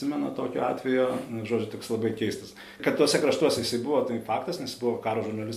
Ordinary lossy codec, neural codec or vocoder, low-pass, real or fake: MP3, 64 kbps; none; 14.4 kHz; real